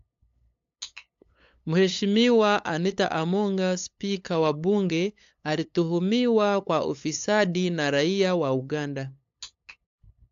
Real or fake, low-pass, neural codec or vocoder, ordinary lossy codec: fake; 7.2 kHz; codec, 16 kHz, 8 kbps, FunCodec, trained on LibriTTS, 25 frames a second; AAC, 64 kbps